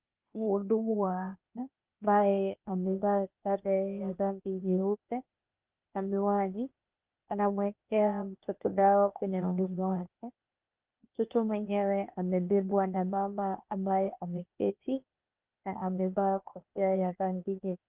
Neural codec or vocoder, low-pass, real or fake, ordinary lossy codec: codec, 16 kHz, 0.8 kbps, ZipCodec; 3.6 kHz; fake; Opus, 32 kbps